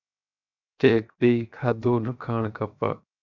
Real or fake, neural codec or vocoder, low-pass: fake; codec, 16 kHz, 0.7 kbps, FocalCodec; 7.2 kHz